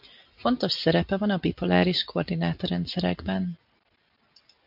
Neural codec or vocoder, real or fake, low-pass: none; real; 5.4 kHz